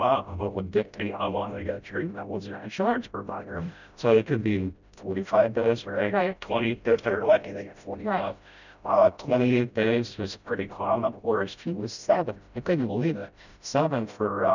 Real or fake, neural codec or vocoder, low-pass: fake; codec, 16 kHz, 0.5 kbps, FreqCodec, smaller model; 7.2 kHz